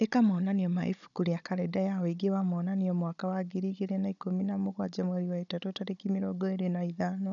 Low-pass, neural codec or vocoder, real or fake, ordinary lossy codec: 7.2 kHz; codec, 16 kHz, 16 kbps, FunCodec, trained on Chinese and English, 50 frames a second; fake; none